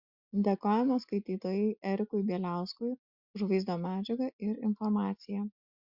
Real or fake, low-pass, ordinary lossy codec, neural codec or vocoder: real; 5.4 kHz; Opus, 64 kbps; none